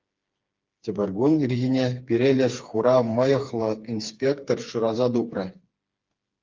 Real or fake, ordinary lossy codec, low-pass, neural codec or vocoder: fake; Opus, 32 kbps; 7.2 kHz; codec, 16 kHz, 4 kbps, FreqCodec, smaller model